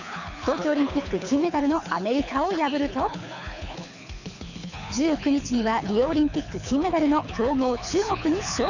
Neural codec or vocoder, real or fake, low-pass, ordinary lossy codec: codec, 24 kHz, 6 kbps, HILCodec; fake; 7.2 kHz; none